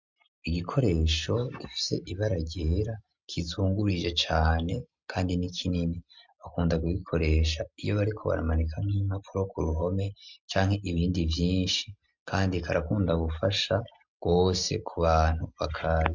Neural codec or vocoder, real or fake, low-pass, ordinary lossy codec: none; real; 7.2 kHz; MP3, 64 kbps